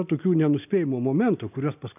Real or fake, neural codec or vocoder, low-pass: real; none; 3.6 kHz